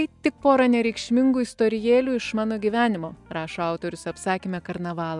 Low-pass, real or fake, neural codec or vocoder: 10.8 kHz; real; none